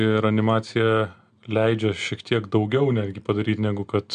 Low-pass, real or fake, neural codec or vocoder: 9.9 kHz; real; none